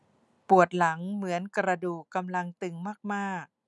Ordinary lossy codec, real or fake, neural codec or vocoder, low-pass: none; real; none; none